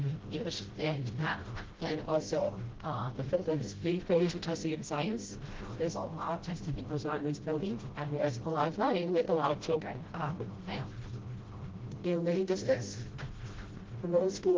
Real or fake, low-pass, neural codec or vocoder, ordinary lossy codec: fake; 7.2 kHz; codec, 16 kHz, 0.5 kbps, FreqCodec, smaller model; Opus, 16 kbps